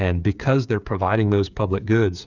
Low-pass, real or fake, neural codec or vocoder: 7.2 kHz; fake; codec, 16 kHz, 8 kbps, FreqCodec, smaller model